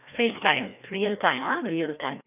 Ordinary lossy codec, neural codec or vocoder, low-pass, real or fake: none; codec, 16 kHz, 1 kbps, FreqCodec, larger model; 3.6 kHz; fake